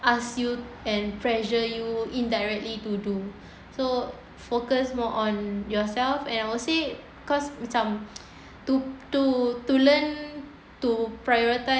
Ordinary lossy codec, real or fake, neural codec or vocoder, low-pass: none; real; none; none